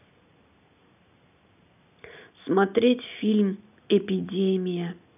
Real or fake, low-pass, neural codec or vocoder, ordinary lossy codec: real; 3.6 kHz; none; none